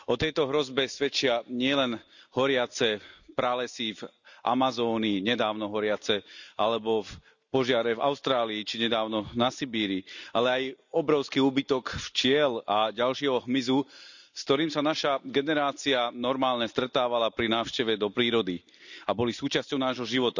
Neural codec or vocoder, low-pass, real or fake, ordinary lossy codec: none; 7.2 kHz; real; none